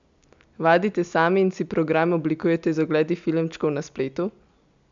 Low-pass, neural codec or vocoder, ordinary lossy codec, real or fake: 7.2 kHz; none; MP3, 64 kbps; real